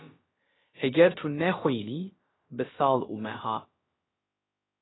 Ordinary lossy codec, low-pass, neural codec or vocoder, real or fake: AAC, 16 kbps; 7.2 kHz; codec, 16 kHz, about 1 kbps, DyCAST, with the encoder's durations; fake